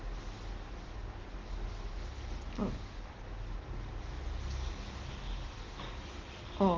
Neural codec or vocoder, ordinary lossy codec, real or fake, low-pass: none; Opus, 16 kbps; real; 7.2 kHz